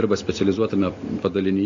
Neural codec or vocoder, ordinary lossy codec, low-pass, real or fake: none; AAC, 64 kbps; 7.2 kHz; real